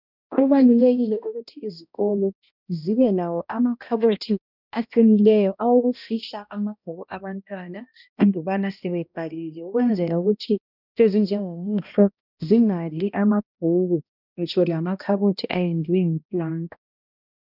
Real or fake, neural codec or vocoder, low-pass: fake; codec, 16 kHz, 1 kbps, X-Codec, HuBERT features, trained on balanced general audio; 5.4 kHz